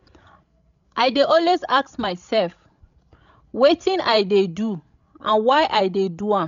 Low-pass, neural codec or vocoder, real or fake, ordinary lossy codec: 7.2 kHz; codec, 16 kHz, 16 kbps, FreqCodec, larger model; fake; none